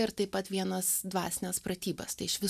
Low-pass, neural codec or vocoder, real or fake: 14.4 kHz; none; real